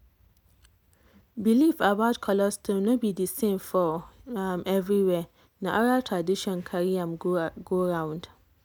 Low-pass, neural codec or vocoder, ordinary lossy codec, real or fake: none; none; none; real